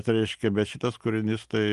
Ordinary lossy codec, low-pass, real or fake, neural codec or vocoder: Opus, 24 kbps; 10.8 kHz; real; none